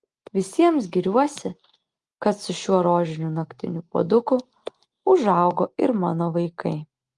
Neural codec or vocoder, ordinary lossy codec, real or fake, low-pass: none; Opus, 24 kbps; real; 10.8 kHz